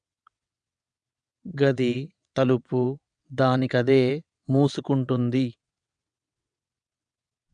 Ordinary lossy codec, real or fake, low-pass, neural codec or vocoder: none; fake; 9.9 kHz; vocoder, 22.05 kHz, 80 mel bands, WaveNeXt